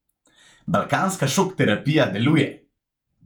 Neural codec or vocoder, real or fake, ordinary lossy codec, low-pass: vocoder, 48 kHz, 128 mel bands, Vocos; fake; none; 19.8 kHz